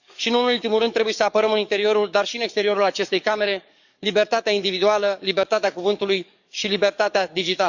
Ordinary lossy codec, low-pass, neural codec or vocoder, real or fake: none; 7.2 kHz; codec, 44.1 kHz, 7.8 kbps, DAC; fake